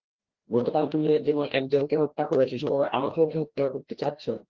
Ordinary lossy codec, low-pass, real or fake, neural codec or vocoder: Opus, 16 kbps; 7.2 kHz; fake; codec, 16 kHz, 1 kbps, FreqCodec, larger model